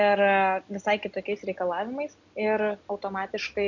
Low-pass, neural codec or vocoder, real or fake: 7.2 kHz; none; real